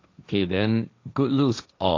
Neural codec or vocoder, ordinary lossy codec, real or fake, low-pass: codec, 16 kHz, 1.1 kbps, Voila-Tokenizer; AAC, 48 kbps; fake; 7.2 kHz